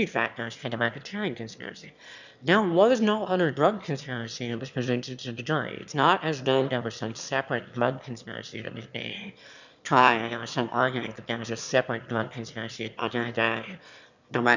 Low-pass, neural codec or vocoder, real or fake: 7.2 kHz; autoencoder, 22.05 kHz, a latent of 192 numbers a frame, VITS, trained on one speaker; fake